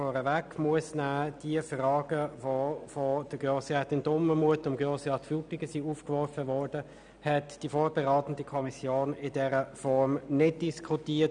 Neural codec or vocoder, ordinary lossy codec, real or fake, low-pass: none; none; real; 9.9 kHz